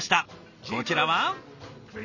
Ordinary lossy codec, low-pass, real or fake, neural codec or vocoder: none; 7.2 kHz; real; none